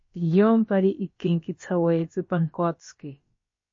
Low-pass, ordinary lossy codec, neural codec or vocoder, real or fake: 7.2 kHz; MP3, 32 kbps; codec, 16 kHz, about 1 kbps, DyCAST, with the encoder's durations; fake